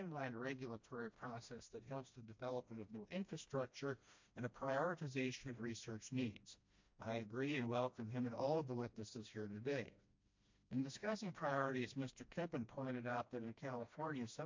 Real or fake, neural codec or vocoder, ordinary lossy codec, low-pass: fake; codec, 16 kHz, 1 kbps, FreqCodec, smaller model; MP3, 48 kbps; 7.2 kHz